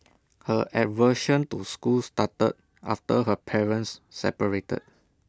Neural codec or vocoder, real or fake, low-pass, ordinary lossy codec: none; real; none; none